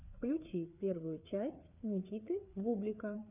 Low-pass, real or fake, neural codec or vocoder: 3.6 kHz; fake; codec, 16 kHz, 4 kbps, FreqCodec, larger model